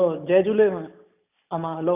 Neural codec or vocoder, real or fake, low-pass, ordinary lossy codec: none; real; 3.6 kHz; none